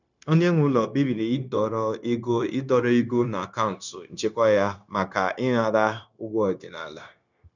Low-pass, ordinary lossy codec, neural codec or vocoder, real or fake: 7.2 kHz; none; codec, 16 kHz, 0.9 kbps, LongCat-Audio-Codec; fake